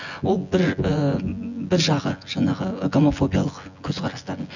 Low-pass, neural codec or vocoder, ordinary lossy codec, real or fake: 7.2 kHz; vocoder, 24 kHz, 100 mel bands, Vocos; none; fake